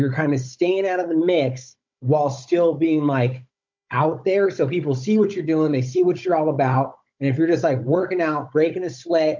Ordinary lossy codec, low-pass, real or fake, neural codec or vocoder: MP3, 48 kbps; 7.2 kHz; fake; codec, 16 kHz, 16 kbps, FunCodec, trained on Chinese and English, 50 frames a second